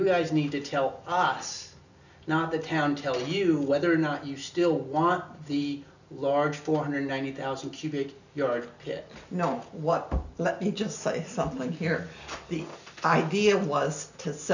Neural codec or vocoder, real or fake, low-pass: none; real; 7.2 kHz